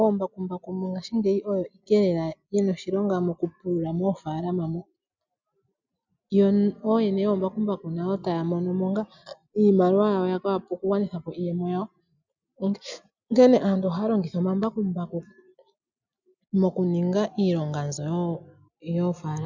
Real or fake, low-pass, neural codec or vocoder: real; 7.2 kHz; none